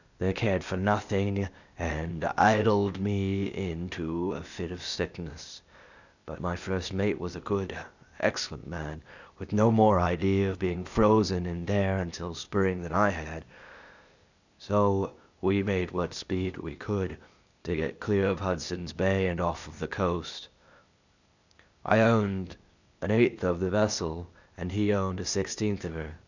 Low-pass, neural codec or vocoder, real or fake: 7.2 kHz; codec, 16 kHz, 0.8 kbps, ZipCodec; fake